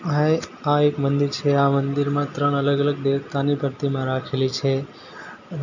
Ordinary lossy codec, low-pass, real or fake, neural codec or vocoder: none; 7.2 kHz; real; none